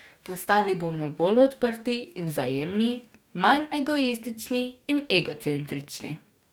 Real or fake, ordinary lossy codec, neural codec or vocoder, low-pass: fake; none; codec, 44.1 kHz, 2.6 kbps, DAC; none